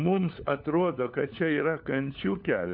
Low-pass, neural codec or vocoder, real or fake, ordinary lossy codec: 5.4 kHz; codec, 16 kHz, 4 kbps, FunCodec, trained on LibriTTS, 50 frames a second; fake; MP3, 48 kbps